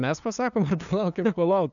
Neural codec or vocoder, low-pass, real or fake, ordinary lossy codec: codec, 16 kHz, 2 kbps, FunCodec, trained on LibriTTS, 25 frames a second; 7.2 kHz; fake; MP3, 96 kbps